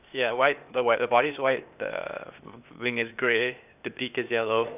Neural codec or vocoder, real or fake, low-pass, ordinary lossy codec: codec, 16 kHz, 0.8 kbps, ZipCodec; fake; 3.6 kHz; none